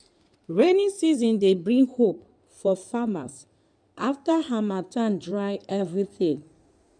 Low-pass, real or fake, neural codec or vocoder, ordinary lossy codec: 9.9 kHz; fake; codec, 16 kHz in and 24 kHz out, 2.2 kbps, FireRedTTS-2 codec; none